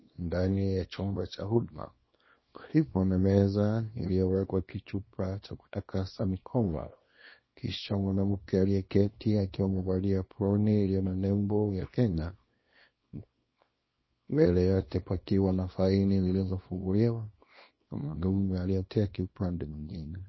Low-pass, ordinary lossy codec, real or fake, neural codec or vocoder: 7.2 kHz; MP3, 24 kbps; fake; codec, 24 kHz, 0.9 kbps, WavTokenizer, small release